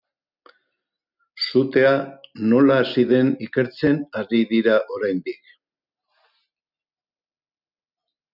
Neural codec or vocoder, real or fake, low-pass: none; real; 5.4 kHz